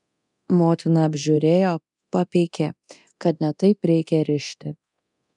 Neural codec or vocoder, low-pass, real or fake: codec, 24 kHz, 0.9 kbps, DualCodec; 10.8 kHz; fake